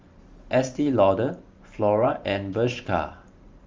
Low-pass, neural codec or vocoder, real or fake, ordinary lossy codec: 7.2 kHz; vocoder, 44.1 kHz, 128 mel bands every 512 samples, BigVGAN v2; fake; Opus, 32 kbps